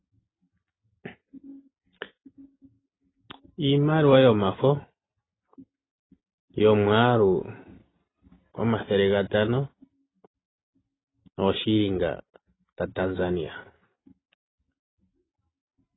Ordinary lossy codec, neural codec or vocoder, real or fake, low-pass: AAC, 16 kbps; none; real; 7.2 kHz